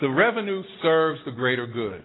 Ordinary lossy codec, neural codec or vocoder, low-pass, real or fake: AAC, 16 kbps; none; 7.2 kHz; real